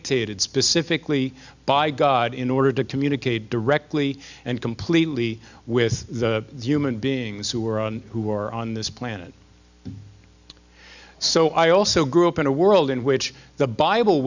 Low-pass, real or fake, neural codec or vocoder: 7.2 kHz; real; none